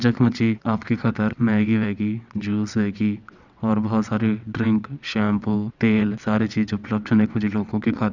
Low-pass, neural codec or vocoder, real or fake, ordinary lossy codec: 7.2 kHz; vocoder, 22.05 kHz, 80 mel bands, WaveNeXt; fake; none